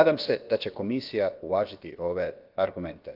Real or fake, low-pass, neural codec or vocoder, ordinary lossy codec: fake; 5.4 kHz; codec, 16 kHz, about 1 kbps, DyCAST, with the encoder's durations; Opus, 32 kbps